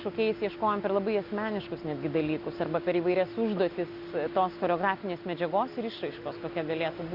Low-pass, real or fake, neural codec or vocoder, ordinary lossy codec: 5.4 kHz; real; none; Opus, 64 kbps